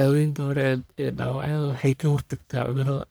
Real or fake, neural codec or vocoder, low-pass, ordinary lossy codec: fake; codec, 44.1 kHz, 1.7 kbps, Pupu-Codec; none; none